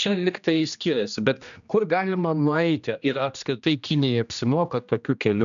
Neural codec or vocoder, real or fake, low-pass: codec, 16 kHz, 1 kbps, X-Codec, HuBERT features, trained on general audio; fake; 7.2 kHz